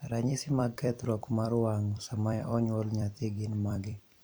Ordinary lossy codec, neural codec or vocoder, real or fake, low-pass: none; vocoder, 44.1 kHz, 128 mel bands every 256 samples, BigVGAN v2; fake; none